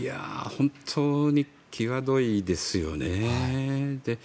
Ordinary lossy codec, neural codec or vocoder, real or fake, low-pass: none; none; real; none